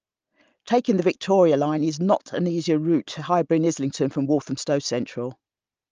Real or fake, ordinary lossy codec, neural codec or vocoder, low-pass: real; Opus, 24 kbps; none; 7.2 kHz